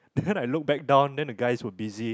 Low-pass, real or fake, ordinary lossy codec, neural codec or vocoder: none; real; none; none